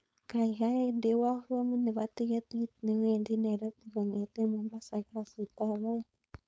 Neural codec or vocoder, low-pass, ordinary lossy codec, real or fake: codec, 16 kHz, 4.8 kbps, FACodec; none; none; fake